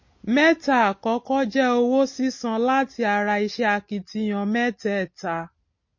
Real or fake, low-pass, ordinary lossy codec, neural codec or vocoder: real; 7.2 kHz; MP3, 32 kbps; none